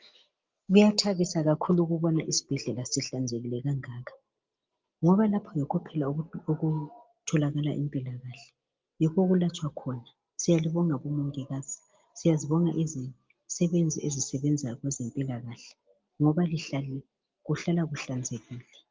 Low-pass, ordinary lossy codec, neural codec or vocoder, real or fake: 7.2 kHz; Opus, 32 kbps; none; real